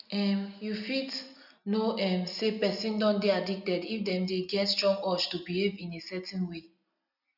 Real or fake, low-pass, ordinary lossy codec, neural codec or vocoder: real; 5.4 kHz; none; none